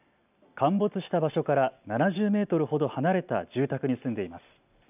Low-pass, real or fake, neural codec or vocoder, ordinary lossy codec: 3.6 kHz; real; none; none